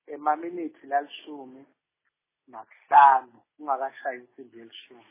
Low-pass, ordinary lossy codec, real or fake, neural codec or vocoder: 3.6 kHz; MP3, 16 kbps; real; none